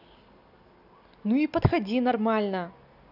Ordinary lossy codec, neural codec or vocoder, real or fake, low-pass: none; none; real; 5.4 kHz